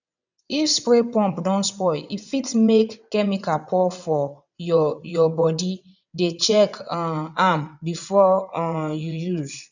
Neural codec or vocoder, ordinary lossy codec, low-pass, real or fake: vocoder, 22.05 kHz, 80 mel bands, WaveNeXt; none; 7.2 kHz; fake